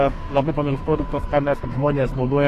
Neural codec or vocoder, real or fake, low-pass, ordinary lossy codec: codec, 32 kHz, 1.9 kbps, SNAC; fake; 14.4 kHz; AAC, 48 kbps